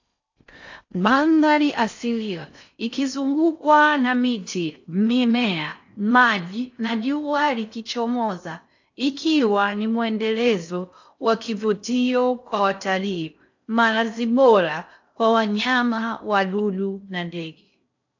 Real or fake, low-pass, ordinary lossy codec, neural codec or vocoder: fake; 7.2 kHz; AAC, 48 kbps; codec, 16 kHz in and 24 kHz out, 0.6 kbps, FocalCodec, streaming, 4096 codes